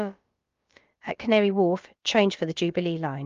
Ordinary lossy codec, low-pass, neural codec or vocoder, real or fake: Opus, 32 kbps; 7.2 kHz; codec, 16 kHz, about 1 kbps, DyCAST, with the encoder's durations; fake